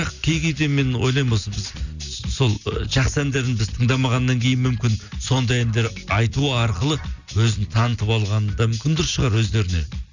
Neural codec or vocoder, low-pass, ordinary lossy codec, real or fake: none; 7.2 kHz; none; real